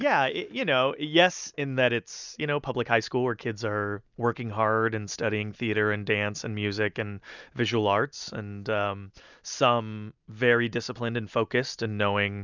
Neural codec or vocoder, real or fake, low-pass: none; real; 7.2 kHz